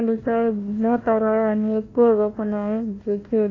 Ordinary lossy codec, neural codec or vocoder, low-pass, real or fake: AAC, 32 kbps; codec, 16 kHz, 1 kbps, FunCodec, trained on Chinese and English, 50 frames a second; 7.2 kHz; fake